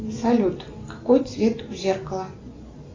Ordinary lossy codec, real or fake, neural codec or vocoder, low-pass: AAC, 32 kbps; real; none; 7.2 kHz